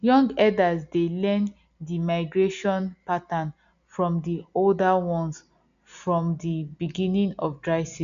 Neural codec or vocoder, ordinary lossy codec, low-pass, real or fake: none; none; 7.2 kHz; real